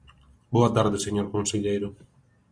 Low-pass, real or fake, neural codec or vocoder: 9.9 kHz; real; none